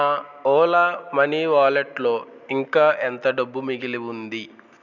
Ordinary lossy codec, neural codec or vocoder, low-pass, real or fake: none; none; 7.2 kHz; real